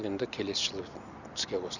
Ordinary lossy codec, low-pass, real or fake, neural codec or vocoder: none; 7.2 kHz; real; none